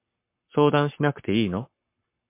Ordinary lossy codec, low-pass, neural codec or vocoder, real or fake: MP3, 32 kbps; 3.6 kHz; codec, 44.1 kHz, 7.8 kbps, Pupu-Codec; fake